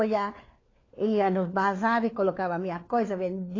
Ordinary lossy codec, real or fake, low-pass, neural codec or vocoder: AAC, 32 kbps; fake; 7.2 kHz; codec, 16 kHz, 4 kbps, FunCodec, trained on LibriTTS, 50 frames a second